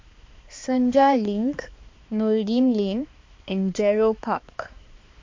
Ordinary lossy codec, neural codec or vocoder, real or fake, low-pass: MP3, 48 kbps; codec, 16 kHz, 2 kbps, X-Codec, HuBERT features, trained on balanced general audio; fake; 7.2 kHz